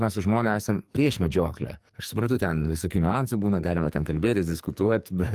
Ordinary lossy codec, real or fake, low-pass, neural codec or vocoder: Opus, 32 kbps; fake; 14.4 kHz; codec, 44.1 kHz, 2.6 kbps, SNAC